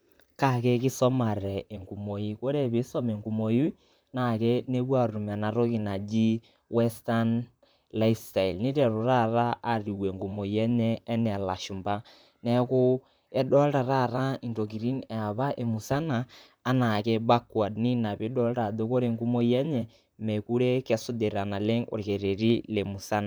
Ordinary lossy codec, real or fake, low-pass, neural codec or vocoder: none; fake; none; vocoder, 44.1 kHz, 128 mel bands, Pupu-Vocoder